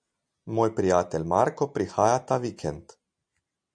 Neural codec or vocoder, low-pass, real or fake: none; 9.9 kHz; real